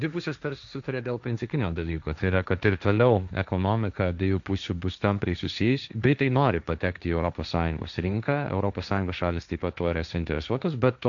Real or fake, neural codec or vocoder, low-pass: fake; codec, 16 kHz, 1.1 kbps, Voila-Tokenizer; 7.2 kHz